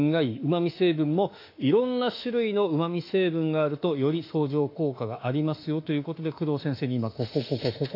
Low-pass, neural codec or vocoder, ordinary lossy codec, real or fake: 5.4 kHz; autoencoder, 48 kHz, 32 numbers a frame, DAC-VAE, trained on Japanese speech; AAC, 32 kbps; fake